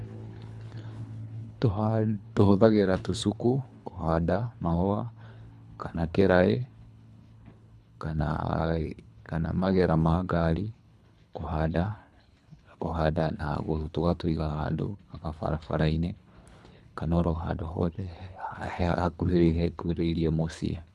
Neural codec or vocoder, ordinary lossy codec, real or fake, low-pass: codec, 24 kHz, 3 kbps, HILCodec; none; fake; none